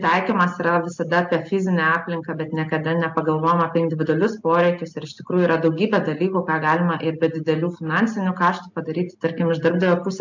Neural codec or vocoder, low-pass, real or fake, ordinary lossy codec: none; 7.2 kHz; real; MP3, 64 kbps